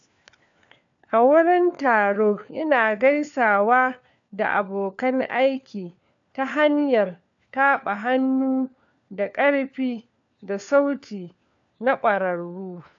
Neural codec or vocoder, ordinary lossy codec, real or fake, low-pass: codec, 16 kHz, 4 kbps, FunCodec, trained on LibriTTS, 50 frames a second; AAC, 64 kbps; fake; 7.2 kHz